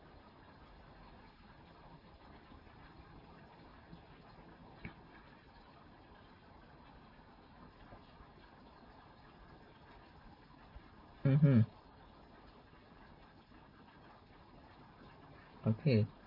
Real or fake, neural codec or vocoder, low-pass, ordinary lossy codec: real; none; 5.4 kHz; MP3, 24 kbps